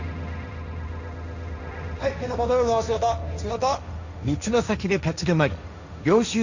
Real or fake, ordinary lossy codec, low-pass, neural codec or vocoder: fake; none; 7.2 kHz; codec, 16 kHz, 1.1 kbps, Voila-Tokenizer